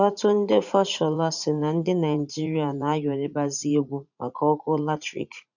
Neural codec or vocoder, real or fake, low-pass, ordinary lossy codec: vocoder, 44.1 kHz, 80 mel bands, Vocos; fake; 7.2 kHz; none